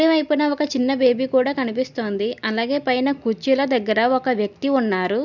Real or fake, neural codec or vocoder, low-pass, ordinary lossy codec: real; none; 7.2 kHz; none